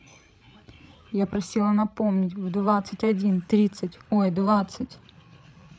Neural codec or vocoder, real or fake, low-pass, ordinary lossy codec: codec, 16 kHz, 8 kbps, FreqCodec, larger model; fake; none; none